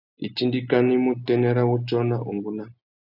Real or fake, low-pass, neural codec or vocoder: real; 5.4 kHz; none